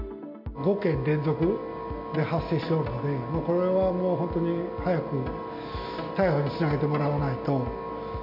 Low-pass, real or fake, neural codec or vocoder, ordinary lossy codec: 5.4 kHz; real; none; MP3, 48 kbps